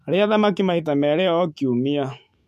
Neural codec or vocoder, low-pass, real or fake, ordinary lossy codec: autoencoder, 48 kHz, 128 numbers a frame, DAC-VAE, trained on Japanese speech; 14.4 kHz; fake; MP3, 64 kbps